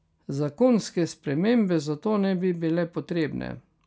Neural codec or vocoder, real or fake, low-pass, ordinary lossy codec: none; real; none; none